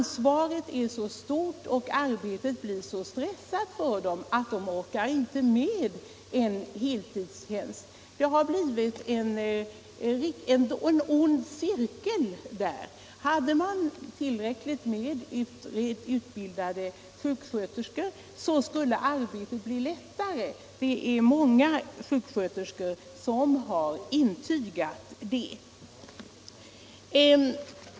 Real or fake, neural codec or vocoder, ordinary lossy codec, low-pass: real; none; none; none